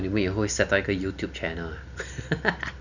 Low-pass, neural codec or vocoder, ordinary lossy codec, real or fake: 7.2 kHz; none; none; real